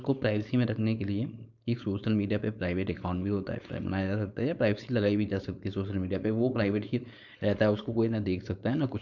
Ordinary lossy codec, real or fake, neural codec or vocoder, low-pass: none; fake; codec, 16 kHz, 4.8 kbps, FACodec; 7.2 kHz